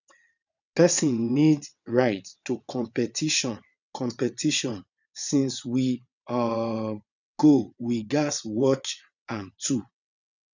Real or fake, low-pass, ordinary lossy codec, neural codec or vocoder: fake; 7.2 kHz; none; vocoder, 22.05 kHz, 80 mel bands, WaveNeXt